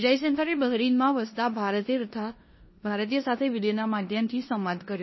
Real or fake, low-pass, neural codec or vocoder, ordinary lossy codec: fake; 7.2 kHz; codec, 16 kHz in and 24 kHz out, 0.9 kbps, LongCat-Audio-Codec, four codebook decoder; MP3, 24 kbps